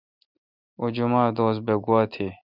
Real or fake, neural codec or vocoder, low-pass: real; none; 5.4 kHz